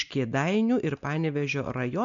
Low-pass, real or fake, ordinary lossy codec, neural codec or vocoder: 7.2 kHz; real; MP3, 96 kbps; none